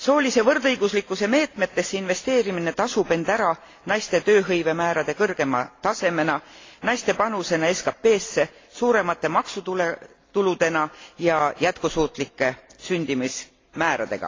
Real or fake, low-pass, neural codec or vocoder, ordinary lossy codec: real; 7.2 kHz; none; AAC, 32 kbps